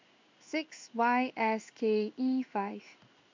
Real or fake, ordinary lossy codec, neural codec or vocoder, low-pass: fake; MP3, 64 kbps; codec, 16 kHz in and 24 kHz out, 1 kbps, XY-Tokenizer; 7.2 kHz